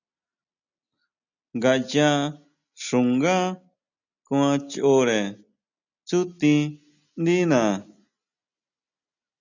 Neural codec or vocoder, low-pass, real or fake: none; 7.2 kHz; real